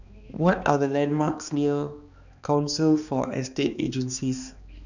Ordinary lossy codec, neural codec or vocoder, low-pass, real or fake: none; codec, 16 kHz, 2 kbps, X-Codec, HuBERT features, trained on balanced general audio; 7.2 kHz; fake